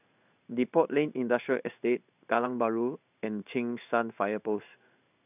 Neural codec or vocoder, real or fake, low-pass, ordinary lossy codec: codec, 16 kHz in and 24 kHz out, 1 kbps, XY-Tokenizer; fake; 3.6 kHz; none